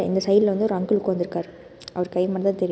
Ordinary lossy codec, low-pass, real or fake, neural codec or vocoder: none; none; real; none